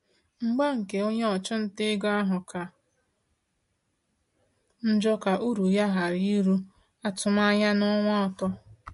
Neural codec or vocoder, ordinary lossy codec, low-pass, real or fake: none; MP3, 48 kbps; 14.4 kHz; real